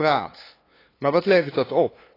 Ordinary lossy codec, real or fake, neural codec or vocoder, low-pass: AAC, 24 kbps; fake; codec, 16 kHz, 2 kbps, FunCodec, trained on LibriTTS, 25 frames a second; 5.4 kHz